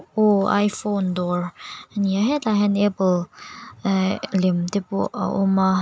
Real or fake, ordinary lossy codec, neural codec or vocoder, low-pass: real; none; none; none